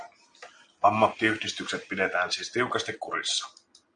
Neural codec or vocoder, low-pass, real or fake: none; 9.9 kHz; real